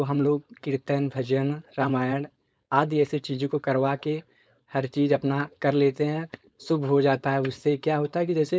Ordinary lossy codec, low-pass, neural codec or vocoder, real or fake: none; none; codec, 16 kHz, 4.8 kbps, FACodec; fake